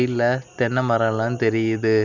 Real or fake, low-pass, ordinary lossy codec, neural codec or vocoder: real; 7.2 kHz; none; none